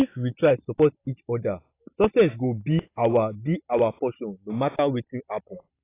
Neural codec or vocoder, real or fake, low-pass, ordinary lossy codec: none; real; 3.6 kHz; AAC, 24 kbps